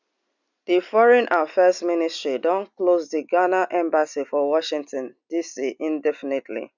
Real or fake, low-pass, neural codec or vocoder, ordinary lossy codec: real; 7.2 kHz; none; none